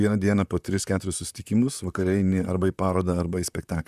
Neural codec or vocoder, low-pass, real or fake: vocoder, 44.1 kHz, 128 mel bands, Pupu-Vocoder; 14.4 kHz; fake